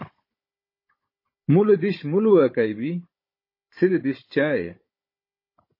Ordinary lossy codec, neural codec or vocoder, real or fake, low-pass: MP3, 24 kbps; codec, 16 kHz, 16 kbps, FunCodec, trained on Chinese and English, 50 frames a second; fake; 5.4 kHz